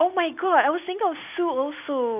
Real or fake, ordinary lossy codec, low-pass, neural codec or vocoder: real; none; 3.6 kHz; none